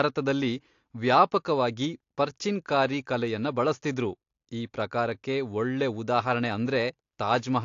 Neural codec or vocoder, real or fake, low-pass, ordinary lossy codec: none; real; 7.2 kHz; AAC, 48 kbps